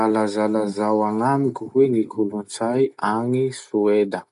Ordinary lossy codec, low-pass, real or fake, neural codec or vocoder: none; 10.8 kHz; real; none